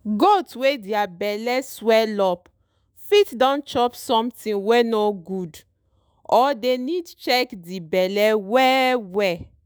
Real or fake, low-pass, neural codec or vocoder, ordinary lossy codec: fake; none; autoencoder, 48 kHz, 128 numbers a frame, DAC-VAE, trained on Japanese speech; none